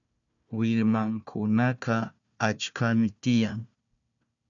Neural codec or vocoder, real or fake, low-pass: codec, 16 kHz, 1 kbps, FunCodec, trained on Chinese and English, 50 frames a second; fake; 7.2 kHz